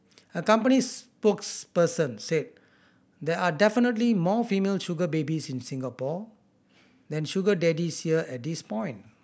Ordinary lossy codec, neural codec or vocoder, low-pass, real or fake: none; none; none; real